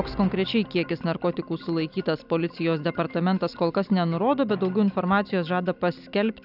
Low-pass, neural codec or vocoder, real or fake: 5.4 kHz; none; real